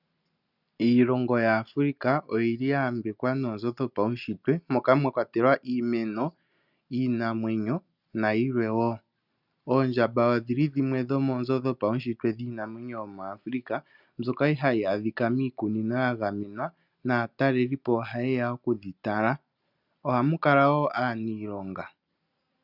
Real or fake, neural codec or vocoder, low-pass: real; none; 5.4 kHz